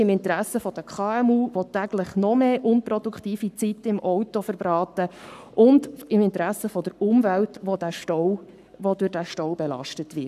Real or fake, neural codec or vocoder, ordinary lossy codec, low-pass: fake; codec, 44.1 kHz, 7.8 kbps, Pupu-Codec; none; 14.4 kHz